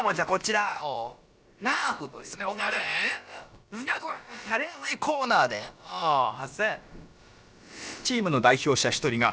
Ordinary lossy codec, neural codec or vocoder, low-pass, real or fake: none; codec, 16 kHz, about 1 kbps, DyCAST, with the encoder's durations; none; fake